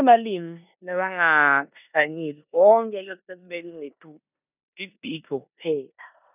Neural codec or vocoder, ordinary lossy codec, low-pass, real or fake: codec, 16 kHz in and 24 kHz out, 0.9 kbps, LongCat-Audio-Codec, four codebook decoder; none; 3.6 kHz; fake